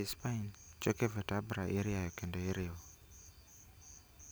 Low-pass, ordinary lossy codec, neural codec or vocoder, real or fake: none; none; none; real